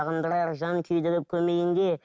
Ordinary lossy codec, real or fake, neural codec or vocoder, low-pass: none; real; none; none